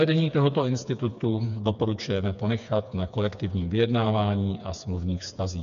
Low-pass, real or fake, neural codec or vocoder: 7.2 kHz; fake; codec, 16 kHz, 4 kbps, FreqCodec, smaller model